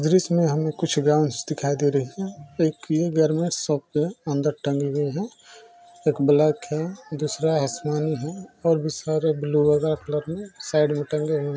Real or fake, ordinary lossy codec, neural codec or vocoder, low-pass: real; none; none; none